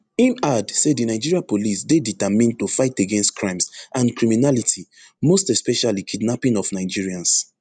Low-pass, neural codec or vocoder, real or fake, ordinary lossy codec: 9.9 kHz; none; real; none